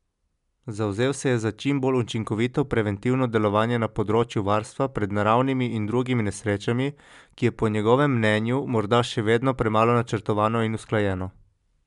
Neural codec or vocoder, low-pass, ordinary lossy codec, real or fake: none; 10.8 kHz; MP3, 96 kbps; real